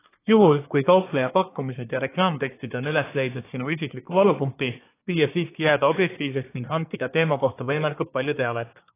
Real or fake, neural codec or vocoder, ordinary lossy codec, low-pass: fake; codec, 24 kHz, 1 kbps, SNAC; AAC, 24 kbps; 3.6 kHz